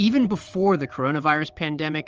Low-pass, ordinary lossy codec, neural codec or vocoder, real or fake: 7.2 kHz; Opus, 24 kbps; vocoder, 22.05 kHz, 80 mel bands, WaveNeXt; fake